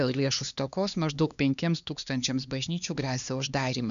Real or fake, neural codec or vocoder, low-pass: fake; codec, 16 kHz, 2 kbps, X-Codec, HuBERT features, trained on LibriSpeech; 7.2 kHz